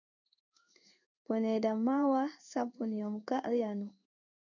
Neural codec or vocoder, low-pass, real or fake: codec, 16 kHz in and 24 kHz out, 1 kbps, XY-Tokenizer; 7.2 kHz; fake